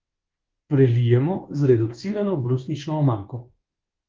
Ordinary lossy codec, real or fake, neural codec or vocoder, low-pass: Opus, 16 kbps; fake; codec, 24 kHz, 1.2 kbps, DualCodec; 7.2 kHz